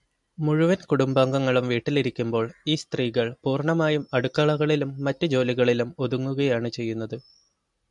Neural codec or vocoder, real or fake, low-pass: none; real; 10.8 kHz